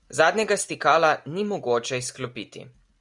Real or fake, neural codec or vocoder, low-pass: real; none; 10.8 kHz